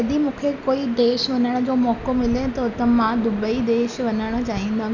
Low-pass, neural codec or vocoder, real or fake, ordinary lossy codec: 7.2 kHz; none; real; none